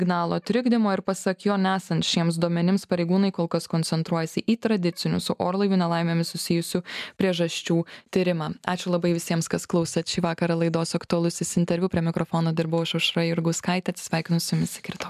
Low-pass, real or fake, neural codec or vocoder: 14.4 kHz; real; none